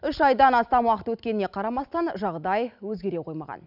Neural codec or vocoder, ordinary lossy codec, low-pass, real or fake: none; none; 5.4 kHz; real